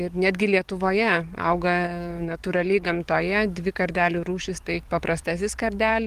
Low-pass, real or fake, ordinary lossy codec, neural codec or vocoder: 14.4 kHz; fake; Opus, 24 kbps; codec, 44.1 kHz, 7.8 kbps, DAC